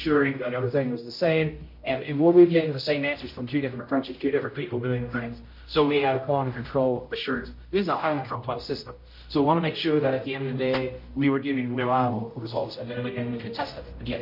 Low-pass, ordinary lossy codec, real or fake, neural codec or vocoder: 5.4 kHz; MP3, 32 kbps; fake; codec, 16 kHz, 0.5 kbps, X-Codec, HuBERT features, trained on general audio